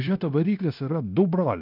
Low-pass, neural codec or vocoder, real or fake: 5.4 kHz; codec, 16 kHz, 0.9 kbps, LongCat-Audio-Codec; fake